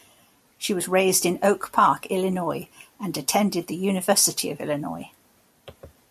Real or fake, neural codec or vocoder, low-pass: real; none; 14.4 kHz